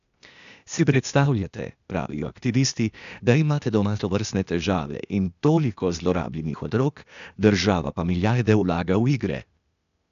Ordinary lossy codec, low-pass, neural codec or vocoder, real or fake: none; 7.2 kHz; codec, 16 kHz, 0.8 kbps, ZipCodec; fake